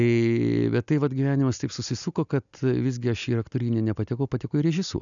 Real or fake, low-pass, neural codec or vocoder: real; 7.2 kHz; none